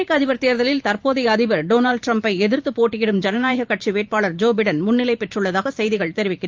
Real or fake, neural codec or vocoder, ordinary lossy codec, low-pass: fake; vocoder, 44.1 kHz, 128 mel bands every 512 samples, BigVGAN v2; Opus, 24 kbps; 7.2 kHz